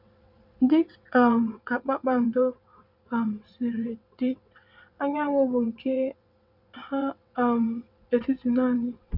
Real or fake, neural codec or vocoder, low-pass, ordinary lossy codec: fake; vocoder, 22.05 kHz, 80 mel bands, WaveNeXt; 5.4 kHz; none